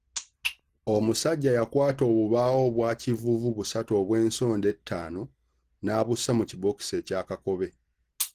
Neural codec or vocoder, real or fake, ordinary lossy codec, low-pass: none; real; Opus, 16 kbps; 14.4 kHz